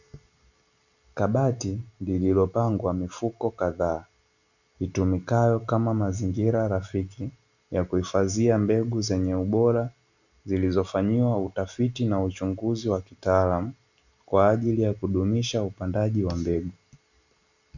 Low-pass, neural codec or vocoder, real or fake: 7.2 kHz; none; real